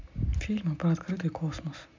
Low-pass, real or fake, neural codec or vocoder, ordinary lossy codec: 7.2 kHz; real; none; MP3, 64 kbps